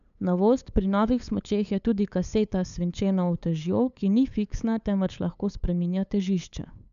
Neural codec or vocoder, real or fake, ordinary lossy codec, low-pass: codec, 16 kHz, 8 kbps, FunCodec, trained on LibriTTS, 25 frames a second; fake; MP3, 96 kbps; 7.2 kHz